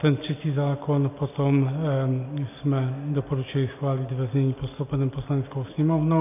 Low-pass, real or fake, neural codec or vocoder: 3.6 kHz; real; none